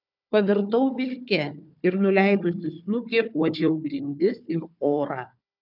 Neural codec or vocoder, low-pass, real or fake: codec, 16 kHz, 4 kbps, FunCodec, trained on Chinese and English, 50 frames a second; 5.4 kHz; fake